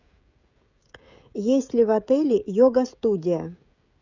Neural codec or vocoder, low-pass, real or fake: codec, 16 kHz, 16 kbps, FreqCodec, smaller model; 7.2 kHz; fake